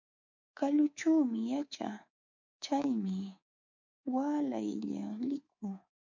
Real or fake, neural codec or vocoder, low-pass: fake; codec, 24 kHz, 3.1 kbps, DualCodec; 7.2 kHz